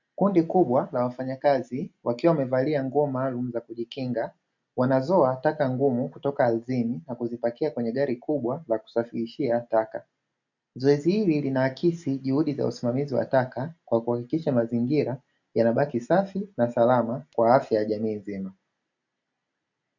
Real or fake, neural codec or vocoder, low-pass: real; none; 7.2 kHz